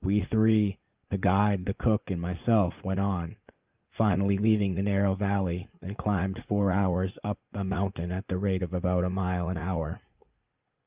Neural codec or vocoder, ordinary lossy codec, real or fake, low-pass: vocoder, 44.1 kHz, 80 mel bands, Vocos; Opus, 24 kbps; fake; 3.6 kHz